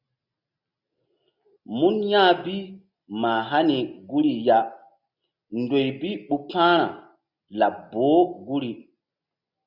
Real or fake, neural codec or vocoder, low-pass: real; none; 5.4 kHz